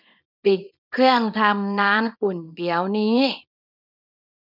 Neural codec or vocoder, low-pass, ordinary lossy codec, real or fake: codec, 24 kHz, 0.9 kbps, WavTokenizer, small release; 5.4 kHz; none; fake